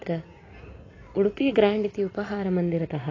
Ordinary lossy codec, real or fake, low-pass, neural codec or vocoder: AAC, 32 kbps; real; 7.2 kHz; none